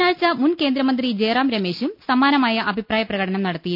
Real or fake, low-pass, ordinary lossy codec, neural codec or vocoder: real; 5.4 kHz; none; none